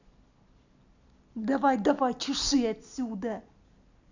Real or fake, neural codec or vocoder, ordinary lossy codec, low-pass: real; none; none; 7.2 kHz